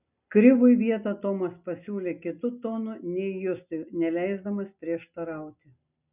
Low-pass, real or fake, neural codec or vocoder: 3.6 kHz; real; none